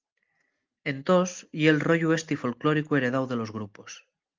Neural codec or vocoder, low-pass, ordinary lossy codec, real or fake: none; 7.2 kHz; Opus, 24 kbps; real